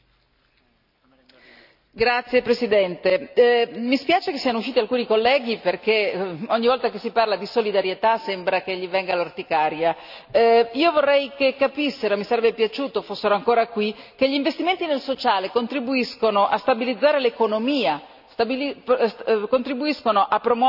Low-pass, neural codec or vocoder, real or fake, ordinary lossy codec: 5.4 kHz; none; real; none